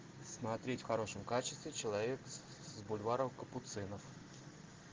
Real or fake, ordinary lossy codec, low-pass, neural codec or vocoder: real; Opus, 16 kbps; 7.2 kHz; none